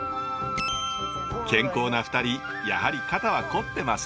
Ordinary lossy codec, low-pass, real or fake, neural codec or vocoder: none; none; real; none